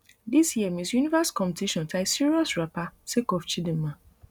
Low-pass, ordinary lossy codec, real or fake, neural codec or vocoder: none; none; real; none